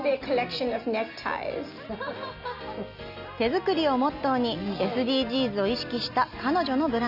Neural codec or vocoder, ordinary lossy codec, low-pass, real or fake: none; none; 5.4 kHz; real